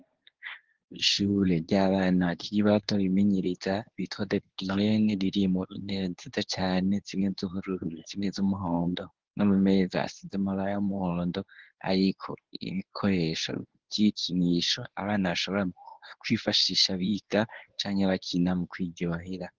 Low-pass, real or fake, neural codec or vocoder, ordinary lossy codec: 7.2 kHz; fake; codec, 24 kHz, 0.9 kbps, WavTokenizer, medium speech release version 1; Opus, 16 kbps